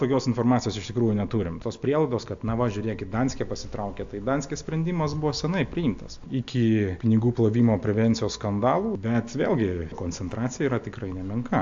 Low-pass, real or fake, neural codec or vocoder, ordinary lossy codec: 7.2 kHz; real; none; AAC, 64 kbps